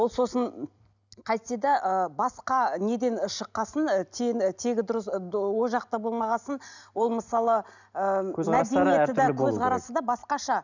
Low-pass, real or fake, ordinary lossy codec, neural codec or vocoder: 7.2 kHz; real; none; none